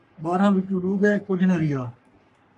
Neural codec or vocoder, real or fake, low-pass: codec, 44.1 kHz, 3.4 kbps, Pupu-Codec; fake; 10.8 kHz